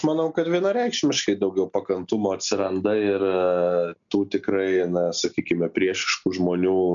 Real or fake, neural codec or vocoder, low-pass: real; none; 7.2 kHz